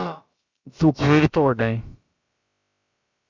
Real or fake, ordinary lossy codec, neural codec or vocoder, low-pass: fake; Opus, 64 kbps; codec, 16 kHz, about 1 kbps, DyCAST, with the encoder's durations; 7.2 kHz